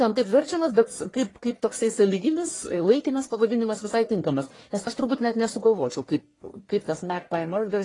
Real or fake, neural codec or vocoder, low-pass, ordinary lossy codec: fake; codec, 44.1 kHz, 1.7 kbps, Pupu-Codec; 10.8 kHz; AAC, 32 kbps